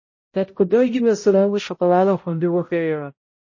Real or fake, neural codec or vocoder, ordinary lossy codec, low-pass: fake; codec, 16 kHz, 0.5 kbps, X-Codec, HuBERT features, trained on balanced general audio; MP3, 32 kbps; 7.2 kHz